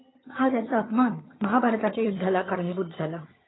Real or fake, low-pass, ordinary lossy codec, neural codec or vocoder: fake; 7.2 kHz; AAC, 16 kbps; vocoder, 22.05 kHz, 80 mel bands, HiFi-GAN